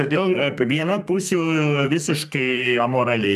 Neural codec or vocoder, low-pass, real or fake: codec, 32 kHz, 1.9 kbps, SNAC; 14.4 kHz; fake